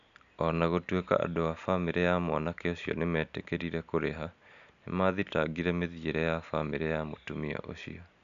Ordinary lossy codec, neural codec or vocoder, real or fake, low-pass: none; none; real; 7.2 kHz